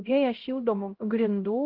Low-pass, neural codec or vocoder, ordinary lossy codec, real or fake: 5.4 kHz; codec, 16 kHz, 0.5 kbps, X-Codec, HuBERT features, trained on LibriSpeech; Opus, 16 kbps; fake